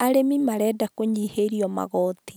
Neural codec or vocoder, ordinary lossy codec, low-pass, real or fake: none; none; none; real